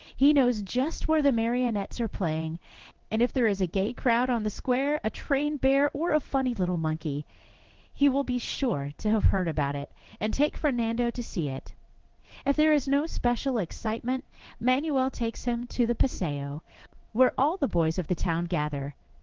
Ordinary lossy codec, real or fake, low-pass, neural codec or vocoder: Opus, 16 kbps; fake; 7.2 kHz; codec, 16 kHz in and 24 kHz out, 1 kbps, XY-Tokenizer